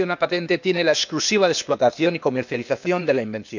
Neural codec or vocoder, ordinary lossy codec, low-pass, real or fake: codec, 16 kHz, 0.8 kbps, ZipCodec; none; 7.2 kHz; fake